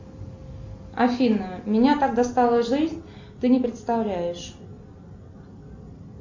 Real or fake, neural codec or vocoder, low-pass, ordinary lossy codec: real; none; 7.2 kHz; MP3, 48 kbps